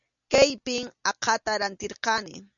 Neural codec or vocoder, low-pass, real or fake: none; 7.2 kHz; real